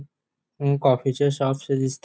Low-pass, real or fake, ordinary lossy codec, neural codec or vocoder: none; real; none; none